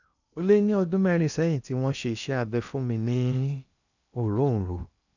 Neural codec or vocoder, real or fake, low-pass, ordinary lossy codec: codec, 16 kHz in and 24 kHz out, 0.8 kbps, FocalCodec, streaming, 65536 codes; fake; 7.2 kHz; none